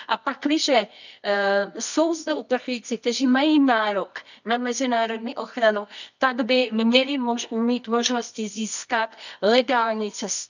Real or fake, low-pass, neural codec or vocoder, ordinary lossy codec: fake; 7.2 kHz; codec, 24 kHz, 0.9 kbps, WavTokenizer, medium music audio release; none